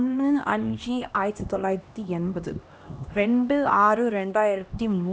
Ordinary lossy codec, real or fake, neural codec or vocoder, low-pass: none; fake; codec, 16 kHz, 1 kbps, X-Codec, HuBERT features, trained on LibriSpeech; none